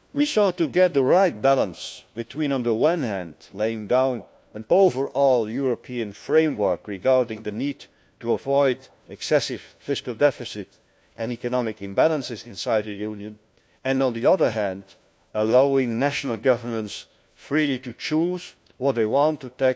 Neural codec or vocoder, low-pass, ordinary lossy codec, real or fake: codec, 16 kHz, 1 kbps, FunCodec, trained on LibriTTS, 50 frames a second; none; none; fake